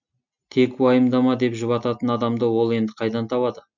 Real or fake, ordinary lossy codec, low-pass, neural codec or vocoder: real; none; 7.2 kHz; none